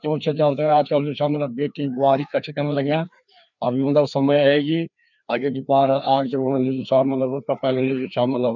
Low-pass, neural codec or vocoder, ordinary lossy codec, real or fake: 7.2 kHz; codec, 16 kHz, 2 kbps, FreqCodec, larger model; none; fake